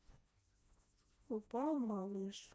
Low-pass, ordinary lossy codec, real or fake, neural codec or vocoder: none; none; fake; codec, 16 kHz, 1 kbps, FreqCodec, smaller model